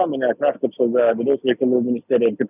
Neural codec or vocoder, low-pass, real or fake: none; 3.6 kHz; real